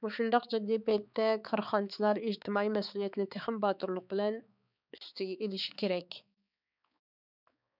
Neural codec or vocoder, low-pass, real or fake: codec, 16 kHz, 4 kbps, X-Codec, HuBERT features, trained on balanced general audio; 5.4 kHz; fake